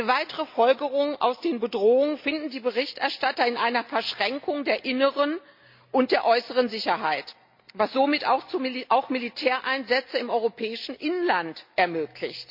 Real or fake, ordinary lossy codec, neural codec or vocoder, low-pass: real; MP3, 48 kbps; none; 5.4 kHz